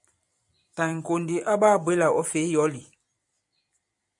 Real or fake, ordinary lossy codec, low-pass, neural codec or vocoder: fake; MP3, 96 kbps; 10.8 kHz; vocoder, 44.1 kHz, 128 mel bands every 256 samples, BigVGAN v2